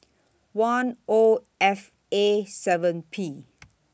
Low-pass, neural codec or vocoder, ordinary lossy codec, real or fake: none; none; none; real